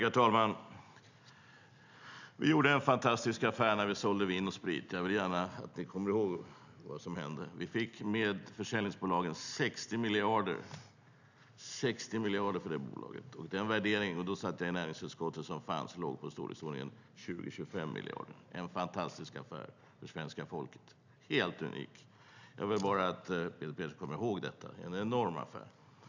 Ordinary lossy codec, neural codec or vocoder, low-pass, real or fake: none; none; 7.2 kHz; real